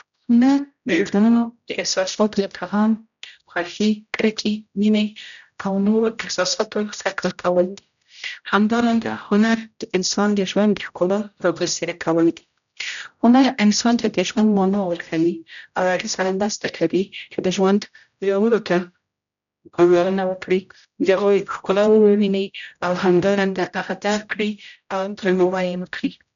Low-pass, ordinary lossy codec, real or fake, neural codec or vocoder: 7.2 kHz; none; fake; codec, 16 kHz, 0.5 kbps, X-Codec, HuBERT features, trained on general audio